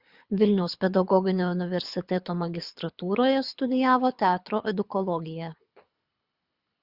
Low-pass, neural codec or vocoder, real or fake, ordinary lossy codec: 5.4 kHz; codec, 24 kHz, 6 kbps, HILCodec; fake; Opus, 64 kbps